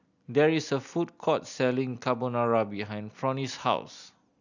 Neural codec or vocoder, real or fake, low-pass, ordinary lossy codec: none; real; 7.2 kHz; none